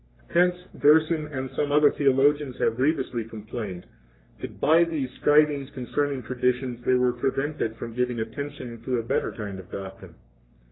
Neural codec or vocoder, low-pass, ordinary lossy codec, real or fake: codec, 44.1 kHz, 3.4 kbps, Pupu-Codec; 7.2 kHz; AAC, 16 kbps; fake